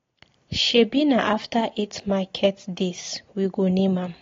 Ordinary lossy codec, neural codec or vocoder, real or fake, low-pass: AAC, 32 kbps; none; real; 7.2 kHz